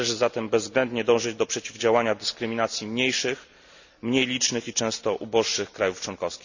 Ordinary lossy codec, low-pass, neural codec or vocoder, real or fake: none; 7.2 kHz; none; real